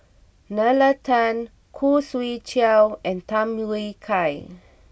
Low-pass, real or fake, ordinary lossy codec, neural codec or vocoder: none; real; none; none